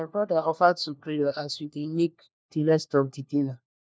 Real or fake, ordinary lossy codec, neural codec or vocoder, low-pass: fake; none; codec, 16 kHz, 1 kbps, FunCodec, trained on LibriTTS, 50 frames a second; none